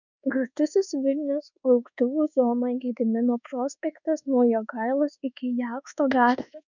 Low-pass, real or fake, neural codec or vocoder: 7.2 kHz; fake; codec, 24 kHz, 1.2 kbps, DualCodec